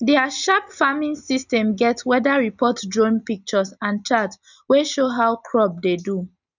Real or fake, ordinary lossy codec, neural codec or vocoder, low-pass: real; none; none; 7.2 kHz